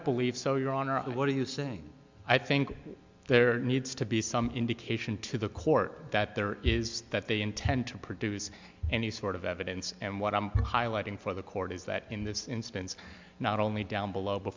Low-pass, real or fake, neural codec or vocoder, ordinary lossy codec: 7.2 kHz; real; none; MP3, 64 kbps